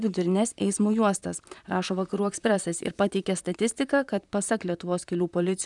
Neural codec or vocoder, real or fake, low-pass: vocoder, 44.1 kHz, 128 mel bands, Pupu-Vocoder; fake; 10.8 kHz